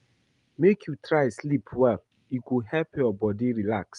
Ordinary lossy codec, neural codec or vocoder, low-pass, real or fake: Opus, 32 kbps; none; 10.8 kHz; real